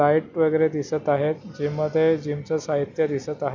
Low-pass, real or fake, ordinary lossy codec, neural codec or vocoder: 7.2 kHz; real; none; none